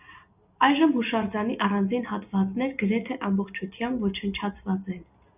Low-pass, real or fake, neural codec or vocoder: 3.6 kHz; real; none